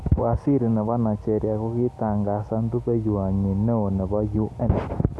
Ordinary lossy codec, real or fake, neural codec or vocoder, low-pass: none; real; none; none